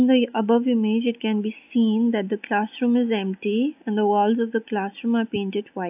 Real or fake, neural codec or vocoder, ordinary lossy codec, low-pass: real; none; AAC, 32 kbps; 3.6 kHz